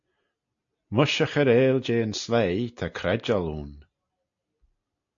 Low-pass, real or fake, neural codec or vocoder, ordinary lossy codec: 7.2 kHz; real; none; AAC, 64 kbps